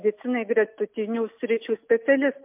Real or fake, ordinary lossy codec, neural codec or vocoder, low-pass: real; MP3, 32 kbps; none; 3.6 kHz